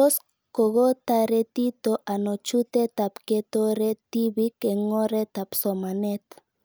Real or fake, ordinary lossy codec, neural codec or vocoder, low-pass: real; none; none; none